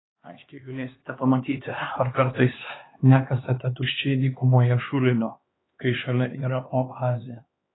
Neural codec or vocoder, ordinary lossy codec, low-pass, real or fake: codec, 16 kHz, 2 kbps, X-Codec, HuBERT features, trained on LibriSpeech; AAC, 16 kbps; 7.2 kHz; fake